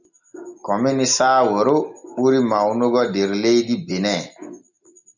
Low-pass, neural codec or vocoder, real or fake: 7.2 kHz; none; real